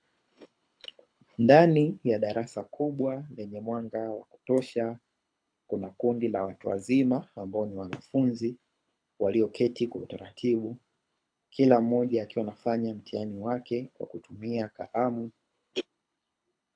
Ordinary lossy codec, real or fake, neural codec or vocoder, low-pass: AAC, 64 kbps; fake; codec, 24 kHz, 6 kbps, HILCodec; 9.9 kHz